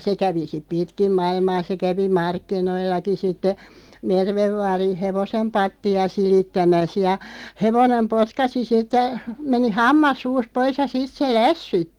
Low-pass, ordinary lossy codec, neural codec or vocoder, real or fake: 19.8 kHz; Opus, 24 kbps; none; real